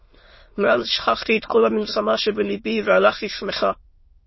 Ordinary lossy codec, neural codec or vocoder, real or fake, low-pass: MP3, 24 kbps; autoencoder, 22.05 kHz, a latent of 192 numbers a frame, VITS, trained on many speakers; fake; 7.2 kHz